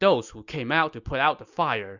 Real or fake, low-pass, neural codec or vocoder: real; 7.2 kHz; none